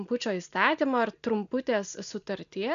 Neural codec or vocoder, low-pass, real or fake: none; 7.2 kHz; real